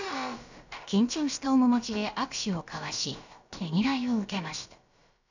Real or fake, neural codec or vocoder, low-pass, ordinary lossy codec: fake; codec, 16 kHz, about 1 kbps, DyCAST, with the encoder's durations; 7.2 kHz; none